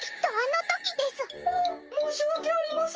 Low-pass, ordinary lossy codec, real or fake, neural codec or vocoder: 7.2 kHz; Opus, 24 kbps; fake; codec, 16 kHz, 6 kbps, DAC